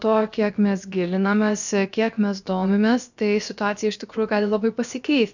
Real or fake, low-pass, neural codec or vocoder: fake; 7.2 kHz; codec, 16 kHz, about 1 kbps, DyCAST, with the encoder's durations